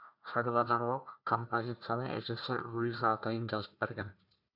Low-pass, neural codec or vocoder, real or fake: 5.4 kHz; codec, 16 kHz, 1 kbps, FunCodec, trained on Chinese and English, 50 frames a second; fake